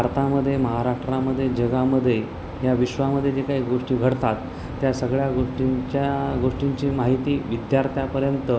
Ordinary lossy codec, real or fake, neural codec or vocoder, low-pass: none; real; none; none